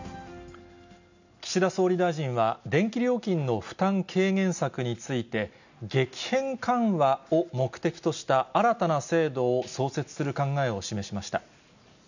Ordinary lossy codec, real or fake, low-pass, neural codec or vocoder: none; real; 7.2 kHz; none